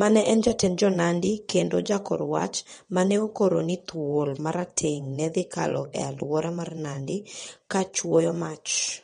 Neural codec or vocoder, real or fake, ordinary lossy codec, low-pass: vocoder, 22.05 kHz, 80 mel bands, WaveNeXt; fake; MP3, 48 kbps; 9.9 kHz